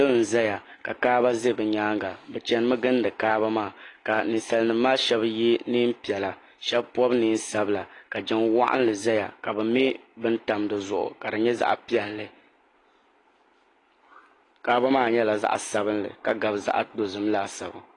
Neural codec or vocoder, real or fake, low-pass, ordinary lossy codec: none; real; 10.8 kHz; AAC, 32 kbps